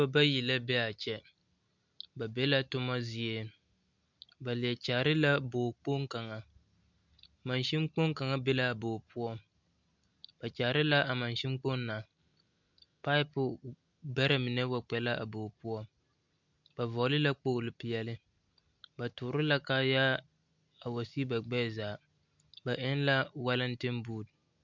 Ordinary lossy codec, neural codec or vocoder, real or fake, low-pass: MP3, 64 kbps; none; real; 7.2 kHz